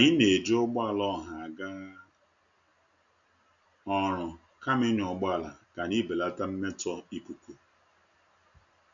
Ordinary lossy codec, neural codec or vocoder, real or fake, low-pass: none; none; real; 7.2 kHz